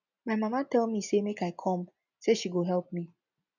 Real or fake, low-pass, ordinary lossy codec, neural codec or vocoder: real; 7.2 kHz; none; none